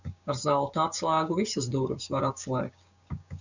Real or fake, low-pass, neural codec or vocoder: fake; 7.2 kHz; codec, 16 kHz, 6 kbps, DAC